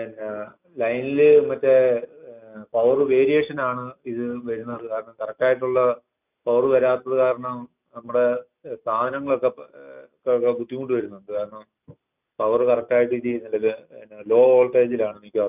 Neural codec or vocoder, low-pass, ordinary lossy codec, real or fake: none; 3.6 kHz; none; real